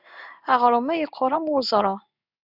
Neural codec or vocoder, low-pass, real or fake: codec, 16 kHz in and 24 kHz out, 1 kbps, XY-Tokenizer; 5.4 kHz; fake